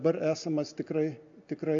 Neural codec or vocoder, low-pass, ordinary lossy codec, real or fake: none; 7.2 kHz; AAC, 48 kbps; real